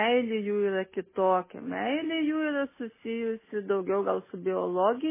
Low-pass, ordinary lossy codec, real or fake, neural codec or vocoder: 3.6 kHz; MP3, 16 kbps; real; none